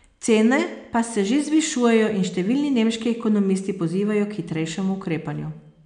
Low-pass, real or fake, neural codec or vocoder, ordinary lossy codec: 9.9 kHz; real; none; none